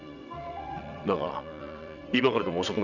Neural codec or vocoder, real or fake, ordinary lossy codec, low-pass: vocoder, 22.05 kHz, 80 mel bands, WaveNeXt; fake; none; 7.2 kHz